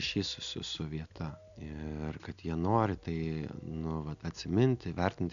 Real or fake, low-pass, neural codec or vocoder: real; 7.2 kHz; none